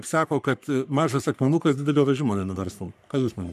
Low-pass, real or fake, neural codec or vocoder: 14.4 kHz; fake; codec, 44.1 kHz, 3.4 kbps, Pupu-Codec